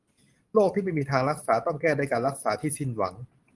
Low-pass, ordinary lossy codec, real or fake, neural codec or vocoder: 10.8 kHz; Opus, 24 kbps; real; none